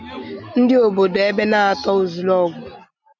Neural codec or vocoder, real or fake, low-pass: none; real; 7.2 kHz